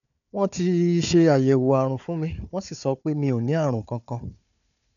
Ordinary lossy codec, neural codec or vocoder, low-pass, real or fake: none; codec, 16 kHz, 4 kbps, FunCodec, trained on Chinese and English, 50 frames a second; 7.2 kHz; fake